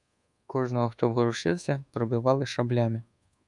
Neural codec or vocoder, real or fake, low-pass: codec, 24 kHz, 1.2 kbps, DualCodec; fake; 10.8 kHz